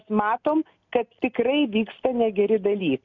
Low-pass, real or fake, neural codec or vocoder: 7.2 kHz; real; none